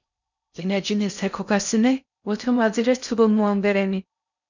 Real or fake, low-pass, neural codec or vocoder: fake; 7.2 kHz; codec, 16 kHz in and 24 kHz out, 0.6 kbps, FocalCodec, streaming, 4096 codes